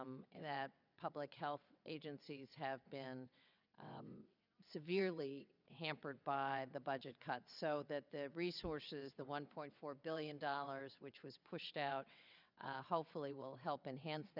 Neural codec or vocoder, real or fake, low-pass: vocoder, 22.05 kHz, 80 mel bands, WaveNeXt; fake; 5.4 kHz